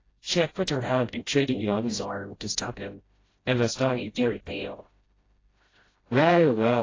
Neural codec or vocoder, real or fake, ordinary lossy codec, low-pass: codec, 16 kHz, 0.5 kbps, FreqCodec, smaller model; fake; AAC, 32 kbps; 7.2 kHz